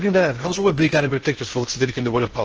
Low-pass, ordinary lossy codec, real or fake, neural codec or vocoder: 7.2 kHz; Opus, 16 kbps; fake; codec, 16 kHz in and 24 kHz out, 0.6 kbps, FocalCodec, streaming, 2048 codes